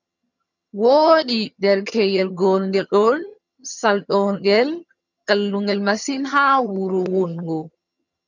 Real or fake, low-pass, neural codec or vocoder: fake; 7.2 kHz; vocoder, 22.05 kHz, 80 mel bands, HiFi-GAN